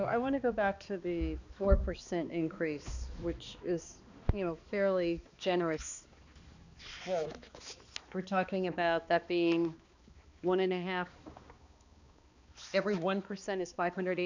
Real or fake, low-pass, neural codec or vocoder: fake; 7.2 kHz; codec, 16 kHz, 2 kbps, X-Codec, HuBERT features, trained on balanced general audio